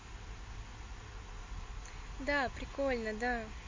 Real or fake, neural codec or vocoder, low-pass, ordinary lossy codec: real; none; 7.2 kHz; MP3, 32 kbps